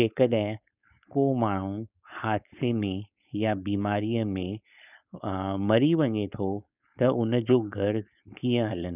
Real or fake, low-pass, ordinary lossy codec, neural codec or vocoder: fake; 3.6 kHz; none; codec, 16 kHz, 4.8 kbps, FACodec